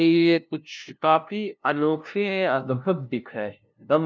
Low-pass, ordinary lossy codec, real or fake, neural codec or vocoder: none; none; fake; codec, 16 kHz, 0.5 kbps, FunCodec, trained on LibriTTS, 25 frames a second